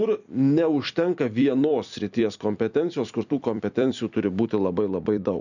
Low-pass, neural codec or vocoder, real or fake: 7.2 kHz; vocoder, 44.1 kHz, 128 mel bands every 256 samples, BigVGAN v2; fake